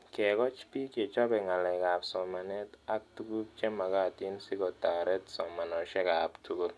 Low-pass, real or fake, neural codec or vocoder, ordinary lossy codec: none; real; none; none